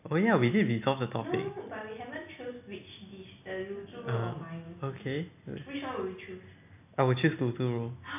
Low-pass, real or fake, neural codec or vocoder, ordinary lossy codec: 3.6 kHz; real; none; none